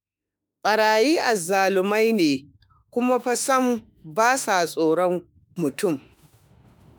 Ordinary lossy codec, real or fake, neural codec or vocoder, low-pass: none; fake; autoencoder, 48 kHz, 32 numbers a frame, DAC-VAE, trained on Japanese speech; none